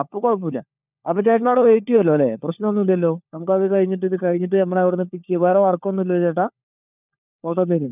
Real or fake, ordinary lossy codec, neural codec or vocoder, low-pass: fake; none; codec, 16 kHz, 4 kbps, FunCodec, trained on LibriTTS, 50 frames a second; 3.6 kHz